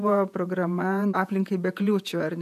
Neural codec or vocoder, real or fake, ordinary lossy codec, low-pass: vocoder, 48 kHz, 128 mel bands, Vocos; fake; AAC, 96 kbps; 14.4 kHz